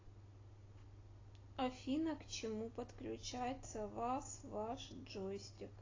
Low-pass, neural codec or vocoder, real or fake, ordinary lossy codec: 7.2 kHz; none; real; AAC, 32 kbps